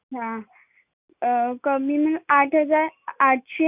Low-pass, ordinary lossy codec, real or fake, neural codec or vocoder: 3.6 kHz; none; real; none